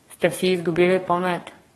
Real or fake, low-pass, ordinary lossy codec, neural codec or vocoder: fake; 14.4 kHz; AAC, 32 kbps; codec, 32 kHz, 1.9 kbps, SNAC